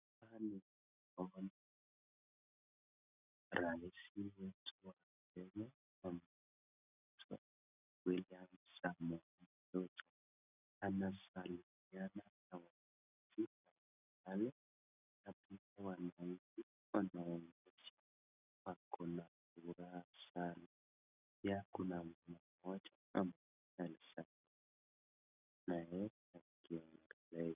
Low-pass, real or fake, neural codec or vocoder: 3.6 kHz; real; none